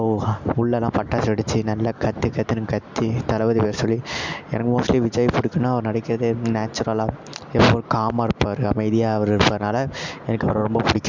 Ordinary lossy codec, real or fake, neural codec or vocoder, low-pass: MP3, 64 kbps; real; none; 7.2 kHz